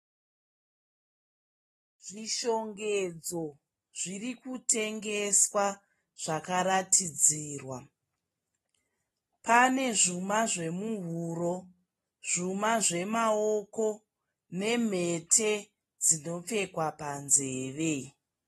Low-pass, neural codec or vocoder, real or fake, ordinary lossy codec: 19.8 kHz; none; real; AAC, 32 kbps